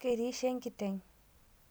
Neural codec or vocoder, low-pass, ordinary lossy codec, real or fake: none; none; none; real